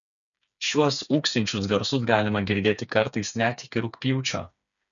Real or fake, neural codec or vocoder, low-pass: fake; codec, 16 kHz, 4 kbps, FreqCodec, smaller model; 7.2 kHz